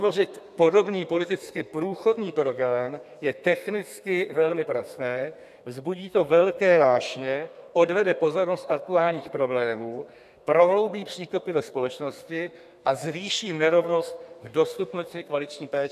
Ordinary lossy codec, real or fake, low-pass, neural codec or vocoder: AAC, 96 kbps; fake; 14.4 kHz; codec, 32 kHz, 1.9 kbps, SNAC